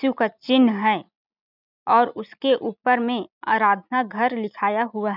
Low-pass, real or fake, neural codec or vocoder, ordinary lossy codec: 5.4 kHz; real; none; none